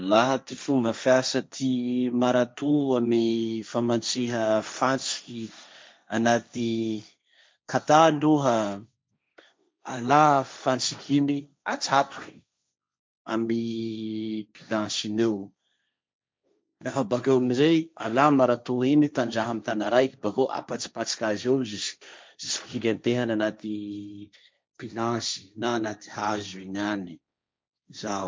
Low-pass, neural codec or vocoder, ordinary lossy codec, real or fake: none; codec, 16 kHz, 1.1 kbps, Voila-Tokenizer; none; fake